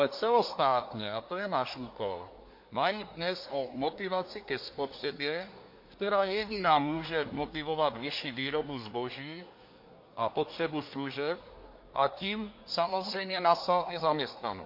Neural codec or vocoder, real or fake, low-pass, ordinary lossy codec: codec, 24 kHz, 1 kbps, SNAC; fake; 5.4 kHz; MP3, 32 kbps